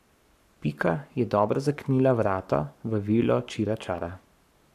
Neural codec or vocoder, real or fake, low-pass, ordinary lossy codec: codec, 44.1 kHz, 7.8 kbps, Pupu-Codec; fake; 14.4 kHz; none